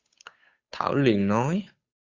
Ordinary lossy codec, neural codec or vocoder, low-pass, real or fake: Opus, 64 kbps; codec, 16 kHz, 8 kbps, FunCodec, trained on Chinese and English, 25 frames a second; 7.2 kHz; fake